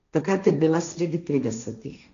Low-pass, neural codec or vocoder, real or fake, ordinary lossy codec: 7.2 kHz; codec, 16 kHz, 1.1 kbps, Voila-Tokenizer; fake; none